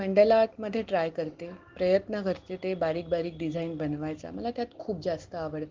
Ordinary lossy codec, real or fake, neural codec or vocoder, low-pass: Opus, 16 kbps; real; none; 7.2 kHz